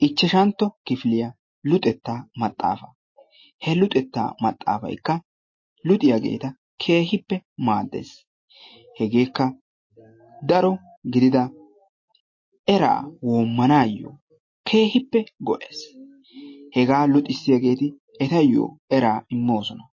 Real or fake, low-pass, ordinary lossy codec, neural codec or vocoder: real; 7.2 kHz; MP3, 32 kbps; none